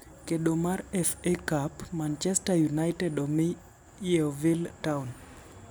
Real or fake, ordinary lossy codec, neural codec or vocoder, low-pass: real; none; none; none